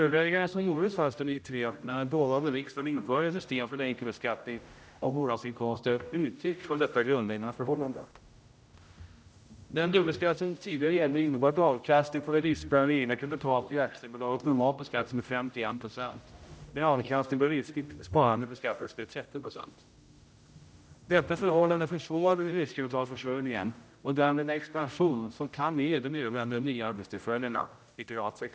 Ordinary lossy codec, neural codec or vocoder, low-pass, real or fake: none; codec, 16 kHz, 0.5 kbps, X-Codec, HuBERT features, trained on general audio; none; fake